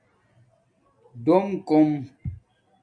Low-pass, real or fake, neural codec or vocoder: 9.9 kHz; real; none